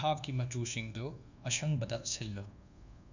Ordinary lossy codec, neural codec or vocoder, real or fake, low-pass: none; codec, 24 kHz, 1.2 kbps, DualCodec; fake; 7.2 kHz